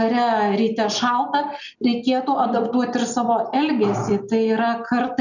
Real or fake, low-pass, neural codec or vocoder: real; 7.2 kHz; none